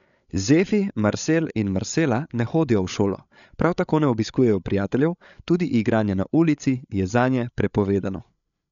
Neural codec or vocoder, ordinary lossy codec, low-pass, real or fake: codec, 16 kHz, 8 kbps, FreqCodec, larger model; none; 7.2 kHz; fake